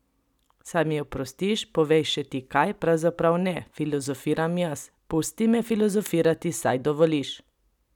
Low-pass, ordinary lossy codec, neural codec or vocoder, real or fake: 19.8 kHz; none; none; real